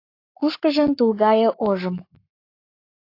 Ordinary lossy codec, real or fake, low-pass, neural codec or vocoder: AAC, 32 kbps; fake; 5.4 kHz; codec, 44.1 kHz, 7.8 kbps, Pupu-Codec